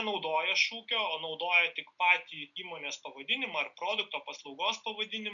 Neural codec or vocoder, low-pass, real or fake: none; 7.2 kHz; real